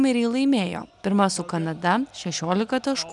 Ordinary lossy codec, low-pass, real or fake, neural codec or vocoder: MP3, 96 kbps; 10.8 kHz; real; none